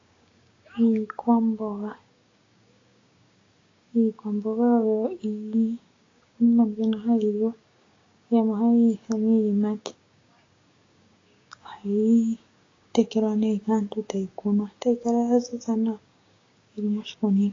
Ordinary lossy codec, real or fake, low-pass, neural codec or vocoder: AAC, 32 kbps; fake; 7.2 kHz; codec, 16 kHz, 6 kbps, DAC